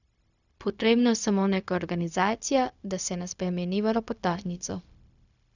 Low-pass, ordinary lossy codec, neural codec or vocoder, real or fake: 7.2 kHz; none; codec, 16 kHz, 0.4 kbps, LongCat-Audio-Codec; fake